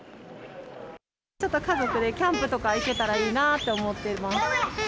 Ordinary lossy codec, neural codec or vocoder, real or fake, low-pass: none; none; real; none